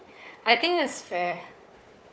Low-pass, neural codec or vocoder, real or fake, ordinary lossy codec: none; codec, 16 kHz, 4 kbps, FunCodec, trained on Chinese and English, 50 frames a second; fake; none